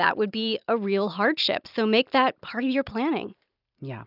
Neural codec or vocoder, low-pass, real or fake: none; 5.4 kHz; real